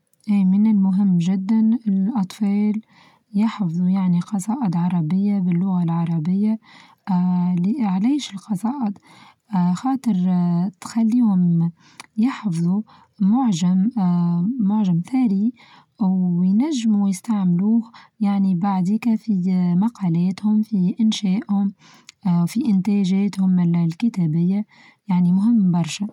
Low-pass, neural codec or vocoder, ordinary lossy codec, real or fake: 19.8 kHz; none; none; real